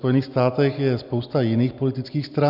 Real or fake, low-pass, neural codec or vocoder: real; 5.4 kHz; none